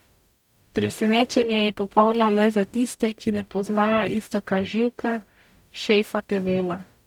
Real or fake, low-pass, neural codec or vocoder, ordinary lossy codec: fake; 19.8 kHz; codec, 44.1 kHz, 0.9 kbps, DAC; none